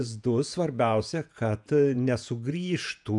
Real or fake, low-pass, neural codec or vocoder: fake; 10.8 kHz; vocoder, 48 kHz, 128 mel bands, Vocos